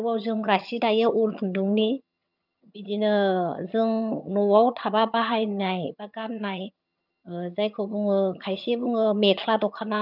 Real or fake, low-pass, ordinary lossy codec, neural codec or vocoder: fake; 5.4 kHz; none; vocoder, 22.05 kHz, 80 mel bands, HiFi-GAN